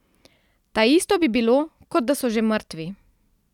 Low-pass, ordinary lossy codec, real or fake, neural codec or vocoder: 19.8 kHz; none; real; none